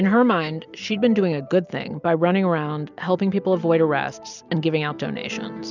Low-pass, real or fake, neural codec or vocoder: 7.2 kHz; real; none